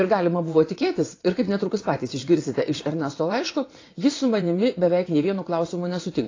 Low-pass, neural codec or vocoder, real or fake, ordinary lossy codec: 7.2 kHz; vocoder, 22.05 kHz, 80 mel bands, WaveNeXt; fake; AAC, 32 kbps